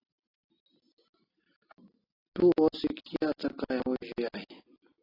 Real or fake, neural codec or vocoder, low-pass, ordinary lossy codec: real; none; 5.4 kHz; AAC, 24 kbps